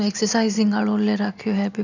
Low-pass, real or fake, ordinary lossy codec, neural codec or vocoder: 7.2 kHz; real; none; none